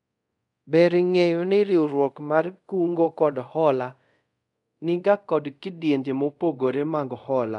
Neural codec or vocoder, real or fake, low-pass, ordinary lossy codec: codec, 24 kHz, 0.5 kbps, DualCodec; fake; 10.8 kHz; none